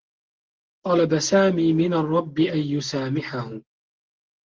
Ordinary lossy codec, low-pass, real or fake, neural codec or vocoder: Opus, 16 kbps; 7.2 kHz; real; none